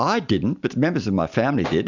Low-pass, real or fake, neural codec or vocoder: 7.2 kHz; real; none